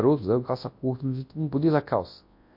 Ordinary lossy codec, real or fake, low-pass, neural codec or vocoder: MP3, 32 kbps; fake; 5.4 kHz; codec, 24 kHz, 0.9 kbps, WavTokenizer, large speech release